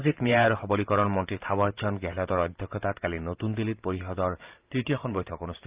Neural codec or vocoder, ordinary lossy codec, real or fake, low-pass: vocoder, 44.1 kHz, 128 mel bands every 512 samples, BigVGAN v2; Opus, 32 kbps; fake; 3.6 kHz